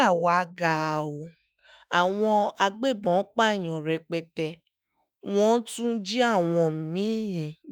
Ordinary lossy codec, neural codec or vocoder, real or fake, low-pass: none; autoencoder, 48 kHz, 32 numbers a frame, DAC-VAE, trained on Japanese speech; fake; none